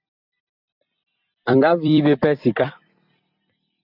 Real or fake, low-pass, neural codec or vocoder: fake; 5.4 kHz; vocoder, 44.1 kHz, 128 mel bands every 256 samples, BigVGAN v2